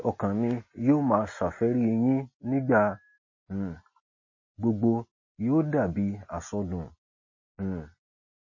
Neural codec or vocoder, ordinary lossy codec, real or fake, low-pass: none; MP3, 32 kbps; real; 7.2 kHz